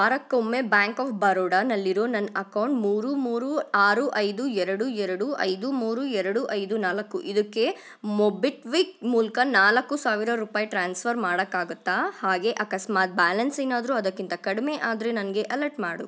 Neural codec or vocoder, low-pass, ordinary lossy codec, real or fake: none; none; none; real